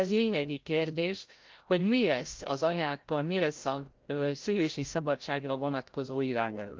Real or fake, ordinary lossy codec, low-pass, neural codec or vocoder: fake; Opus, 24 kbps; 7.2 kHz; codec, 16 kHz, 0.5 kbps, FreqCodec, larger model